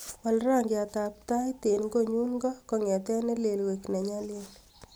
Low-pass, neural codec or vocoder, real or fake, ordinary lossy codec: none; none; real; none